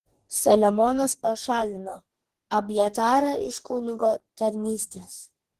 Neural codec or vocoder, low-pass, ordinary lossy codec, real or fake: codec, 44.1 kHz, 2.6 kbps, DAC; 14.4 kHz; Opus, 16 kbps; fake